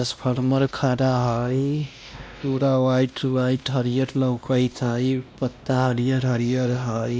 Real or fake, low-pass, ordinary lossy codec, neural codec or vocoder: fake; none; none; codec, 16 kHz, 1 kbps, X-Codec, WavLM features, trained on Multilingual LibriSpeech